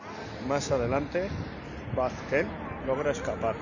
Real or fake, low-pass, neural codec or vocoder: real; 7.2 kHz; none